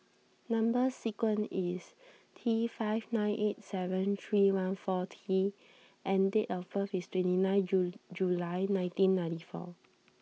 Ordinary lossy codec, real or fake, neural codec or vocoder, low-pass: none; real; none; none